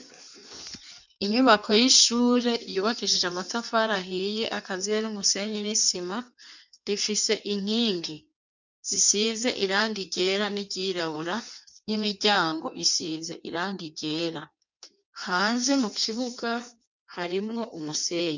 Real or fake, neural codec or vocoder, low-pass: fake; codec, 16 kHz in and 24 kHz out, 1.1 kbps, FireRedTTS-2 codec; 7.2 kHz